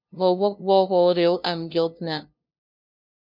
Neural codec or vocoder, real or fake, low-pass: codec, 16 kHz, 0.5 kbps, FunCodec, trained on LibriTTS, 25 frames a second; fake; 5.4 kHz